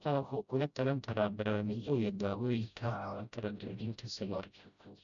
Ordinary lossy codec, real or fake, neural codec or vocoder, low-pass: none; fake; codec, 16 kHz, 0.5 kbps, FreqCodec, smaller model; 7.2 kHz